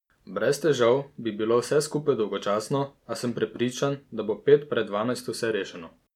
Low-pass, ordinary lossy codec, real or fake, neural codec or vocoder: 19.8 kHz; none; real; none